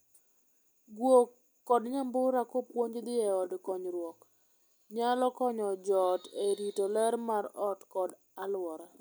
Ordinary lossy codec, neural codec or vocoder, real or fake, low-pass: none; none; real; none